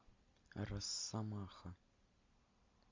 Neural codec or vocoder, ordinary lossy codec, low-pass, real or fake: none; MP3, 48 kbps; 7.2 kHz; real